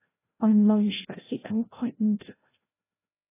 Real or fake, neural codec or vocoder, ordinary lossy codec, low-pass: fake; codec, 16 kHz, 0.5 kbps, FreqCodec, larger model; AAC, 16 kbps; 3.6 kHz